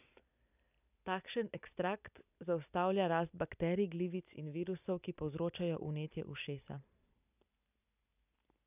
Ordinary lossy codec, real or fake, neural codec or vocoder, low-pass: none; real; none; 3.6 kHz